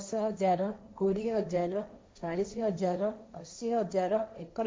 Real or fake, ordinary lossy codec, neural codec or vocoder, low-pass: fake; none; codec, 16 kHz, 1.1 kbps, Voila-Tokenizer; none